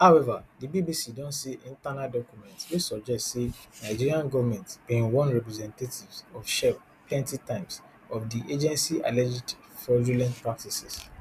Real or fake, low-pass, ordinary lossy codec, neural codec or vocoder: real; 14.4 kHz; none; none